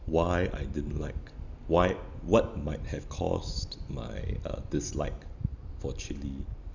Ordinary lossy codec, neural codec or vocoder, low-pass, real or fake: none; none; 7.2 kHz; real